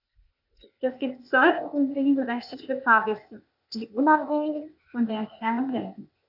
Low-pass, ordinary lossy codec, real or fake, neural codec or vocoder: 5.4 kHz; none; fake; codec, 16 kHz, 0.8 kbps, ZipCodec